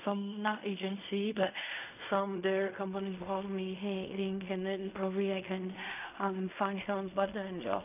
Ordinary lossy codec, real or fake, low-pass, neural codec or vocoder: none; fake; 3.6 kHz; codec, 16 kHz in and 24 kHz out, 0.4 kbps, LongCat-Audio-Codec, fine tuned four codebook decoder